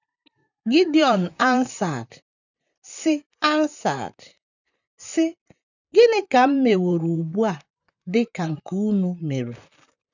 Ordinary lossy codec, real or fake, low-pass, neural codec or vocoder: none; fake; 7.2 kHz; vocoder, 44.1 kHz, 128 mel bands, Pupu-Vocoder